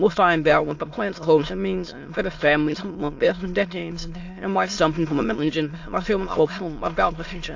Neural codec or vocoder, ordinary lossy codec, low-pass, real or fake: autoencoder, 22.05 kHz, a latent of 192 numbers a frame, VITS, trained on many speakers; AAC, 48 kbps; 7.2 kHz; fake